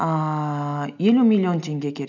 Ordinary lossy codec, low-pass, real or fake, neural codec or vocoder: none; 7.2 kHz; real; none